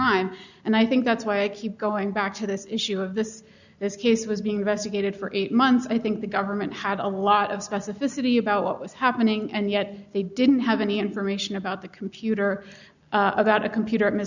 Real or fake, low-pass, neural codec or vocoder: real; 7.2 kHz; none